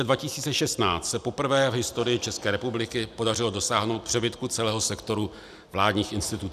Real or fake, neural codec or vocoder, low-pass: real; none; 14.4 kHz